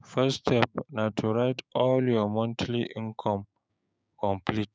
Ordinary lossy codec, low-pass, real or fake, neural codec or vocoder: none; none; fake; codec, 16 kHz, 6 kbps, DAC